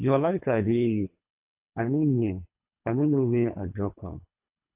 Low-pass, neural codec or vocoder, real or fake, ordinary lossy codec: 3.6 kHz; codec, 24 kHz, 3 kbps, HILCodec; fake; AAC, 32 kbps